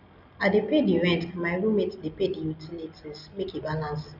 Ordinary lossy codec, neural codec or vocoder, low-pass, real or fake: none; none; 5.4 kHz; real